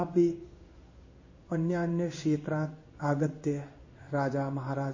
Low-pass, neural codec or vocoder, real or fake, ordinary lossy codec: 7.2 kHz; codec, 16 kHz in and 24 kHz out, 1 kbps, XY-Tokenizer; fake; MP3, 32 kbps